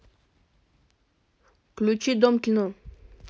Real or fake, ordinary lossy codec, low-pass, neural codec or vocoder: real; none; none; none